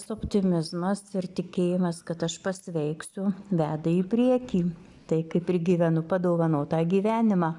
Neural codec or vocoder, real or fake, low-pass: none; real; 10.8 kHz